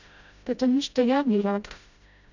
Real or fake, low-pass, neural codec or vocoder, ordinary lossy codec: fake; 7.2 kHz; codec, 16 kHz, 0.5 kbps, FreqCodec, smaller model; none